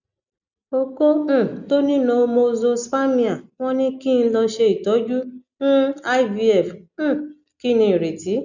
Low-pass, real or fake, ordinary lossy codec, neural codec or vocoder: 7.2 kHz; real; none; none